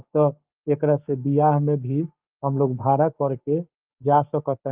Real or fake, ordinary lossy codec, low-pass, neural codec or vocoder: fake; Opus, 32 kbps; 3.6 kHz; codec, 16 kHz, 6 kbps, DAC